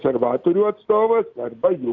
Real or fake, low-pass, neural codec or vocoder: real; 7.2 kHz; none